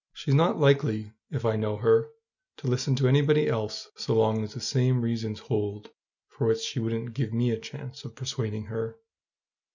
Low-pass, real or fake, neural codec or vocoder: 7.2 kHz; real; none